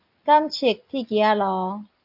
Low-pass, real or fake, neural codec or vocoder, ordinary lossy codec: 5.4 kHz; real; none; AAC, 48 kbps